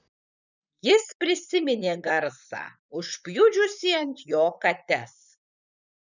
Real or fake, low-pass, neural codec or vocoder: fake; 7.2 kHz; vocoder, 44.1 kHz, 128 mel bands, Pupu-Vocoder